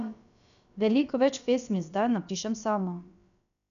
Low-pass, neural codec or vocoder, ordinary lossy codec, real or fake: 7.2 kHz; codec, 16 kHz, about 1 kbps, DyCAST, with the encoder's durations; none; fake